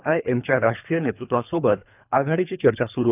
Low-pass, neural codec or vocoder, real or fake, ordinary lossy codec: 3.6 kHz; codec, 24 kHz, 3 kbps, HILCodec; fake; none